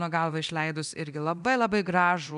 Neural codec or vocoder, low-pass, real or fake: codec, 24 kHz, 0.9 kbps, DualCodec; 10.8 kHz; fake